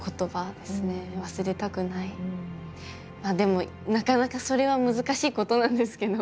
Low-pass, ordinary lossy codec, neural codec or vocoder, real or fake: none; none; none; real